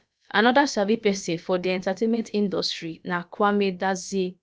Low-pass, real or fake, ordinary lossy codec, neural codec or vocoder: none; fake; none; codec, 16 kHz, about 1 kbps, DyCAST, with the encoder's durations